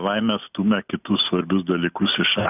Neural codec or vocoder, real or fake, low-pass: none; real; 3.6 kHz